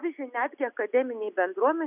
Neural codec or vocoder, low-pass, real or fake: none; 3.6 kHz; real